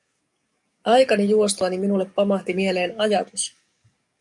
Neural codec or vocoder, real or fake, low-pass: codec, 44.1 kHz, 7.8 kbps, DAC; fake; 10.8 kHz